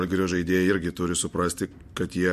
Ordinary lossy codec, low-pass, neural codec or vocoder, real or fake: MP3, 64 kbps; 14.4 kHz; none; real